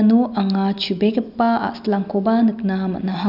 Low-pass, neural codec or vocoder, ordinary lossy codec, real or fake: 5.4 kHz; none; none; real